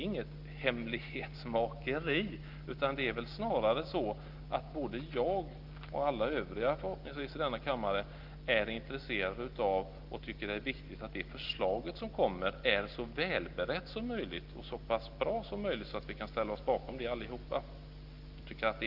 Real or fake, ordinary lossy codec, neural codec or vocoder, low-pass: real; Opus, 32 kbps; none; 5.4 kHz